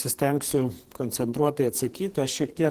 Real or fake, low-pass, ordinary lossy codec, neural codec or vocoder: fake; 14.4 kHz; Opus, 16 kbps; codec, 44.1 kHz, 2.6 kbps, SNAC